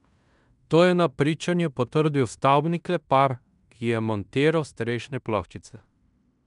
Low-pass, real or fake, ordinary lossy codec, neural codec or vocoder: 10.8 kHz; fake; MP3, 96 kbps; codec, 16 kHz in and 24 kHz out, 0.9 kbps, LongCat-Audio-Codec, fine tuned four codebook decoder